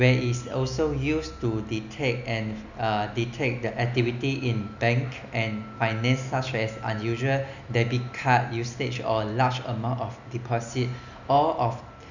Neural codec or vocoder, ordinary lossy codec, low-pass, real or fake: none; none; 7.2 kHz; real